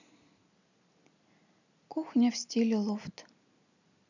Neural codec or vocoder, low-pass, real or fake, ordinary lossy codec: none; 7.2 kHz; real; none